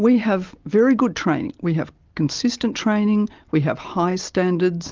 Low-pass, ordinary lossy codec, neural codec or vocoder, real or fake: 7.2 kHz; Opus, 32 kbps; none; real